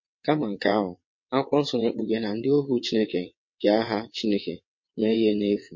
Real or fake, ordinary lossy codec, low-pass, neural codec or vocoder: fake; MP3, 32 kbps; 7.2 kHz; vocoder, 22.05 kHz, 80 mel bands, WaveNeXt